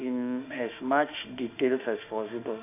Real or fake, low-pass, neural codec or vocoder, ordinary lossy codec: fake; 3.6 kHz; autoencoder, 48 kHz, 32 numbers a frame, DAC-VAE, trained on Japanese speech; AAC, 32 kbps